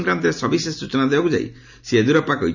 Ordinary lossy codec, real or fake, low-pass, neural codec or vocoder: none; real; 7.2 kHz; none